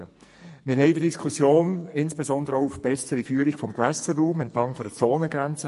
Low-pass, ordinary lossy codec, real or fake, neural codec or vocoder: 14.4 kHz; MP3, 48 kbps; fake; codec, 44.1 kHz, 2.6 kbps, SNAC